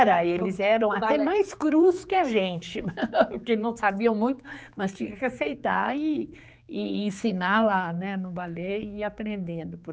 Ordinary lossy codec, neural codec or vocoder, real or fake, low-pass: none; codec, 16 kHz, 4 kbps, X-Codec, HuBERT features, trained on general audio; fake; none